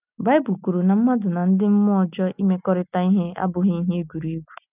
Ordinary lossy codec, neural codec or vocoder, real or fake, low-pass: none; none; real; 3.6 kHz